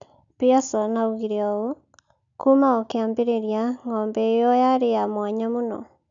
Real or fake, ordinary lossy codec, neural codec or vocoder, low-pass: real; none; none; 7.2 kHz